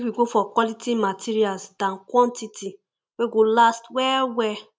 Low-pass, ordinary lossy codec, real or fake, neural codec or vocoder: none; none; real; none